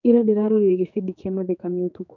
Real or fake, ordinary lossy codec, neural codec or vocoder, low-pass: fake; none; codec, 16 kHz, 4 kbps, X-Codec, HuBERT features, trained on general audio; 7.2 kHz